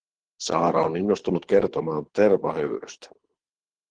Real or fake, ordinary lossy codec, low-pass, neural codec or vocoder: fake; Opus, 16 kbps; 9.9 kHz; codec, 24 kHz, 6 kbps, HILCodec